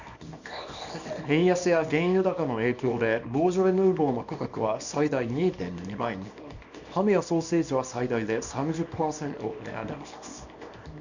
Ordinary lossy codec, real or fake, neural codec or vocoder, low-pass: none; fake; codec, 24 kHz, 0.9 kbps, WavTokenizer, small release; 7.2 kHz